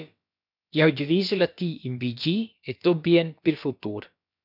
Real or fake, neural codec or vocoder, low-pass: fake; codec, 16 kHz, about 1 kbps, DyCAST, with the encoder's durations; 5.4 kHz